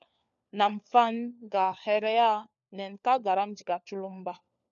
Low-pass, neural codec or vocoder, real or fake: 7.2 kHz; codec, 16 kHz, 4 kbps, FunCodec, trained on LibriTTS, 50 frames a second; fake